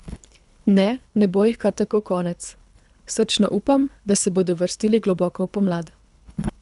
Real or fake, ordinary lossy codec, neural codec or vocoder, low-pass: fake; none; codec, 24 kHz, 3 kbps, HILCodec; 10.8 kHz